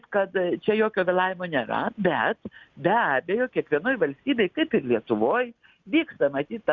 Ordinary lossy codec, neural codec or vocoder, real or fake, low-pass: Opus, 64 kbps; none; real; 7.2 kHz